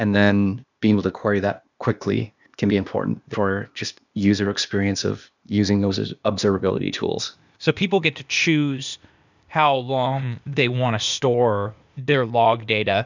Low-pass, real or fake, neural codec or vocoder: 7.2 kHz; fake; codec, 16 kHz, 0.8 kbps, ZipCodec